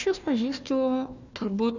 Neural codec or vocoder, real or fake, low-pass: codec, 16 kHz, 1 kbps, FunCodec, trained on Chinese and English, 50 frames a second; fake; 7.2 kHz